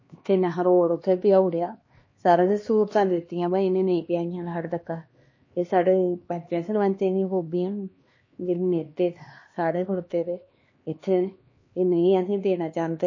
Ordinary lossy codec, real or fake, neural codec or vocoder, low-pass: MP3, 32 kbps; fake; codec, 16 kHz, 2 kbps, X-Codec, HuBERT features, trained on LibriSpeech; 7.2 kHz